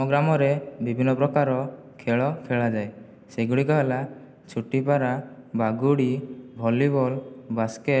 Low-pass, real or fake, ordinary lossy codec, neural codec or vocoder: none; real; none; none